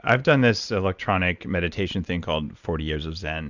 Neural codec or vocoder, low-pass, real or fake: none; 7.2 kHz; real